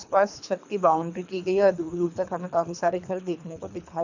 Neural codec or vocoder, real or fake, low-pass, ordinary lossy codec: codec, 24 kHz, 3 kbps, HILCodec; fake; 7.2 kHz; none